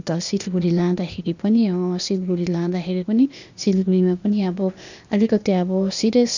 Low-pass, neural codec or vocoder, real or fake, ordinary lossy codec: 7.2 kHz; codec, 16 kHz, 0.8 kbps, ZipCodec; fake; none